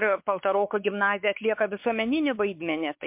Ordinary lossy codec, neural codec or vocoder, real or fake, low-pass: MP3, 32 kbps; codec, 16 kHz, 4 kbps, X-Codec, WavLM features, trained on Multilingual LibriSpeech; fake; 3.6 kHz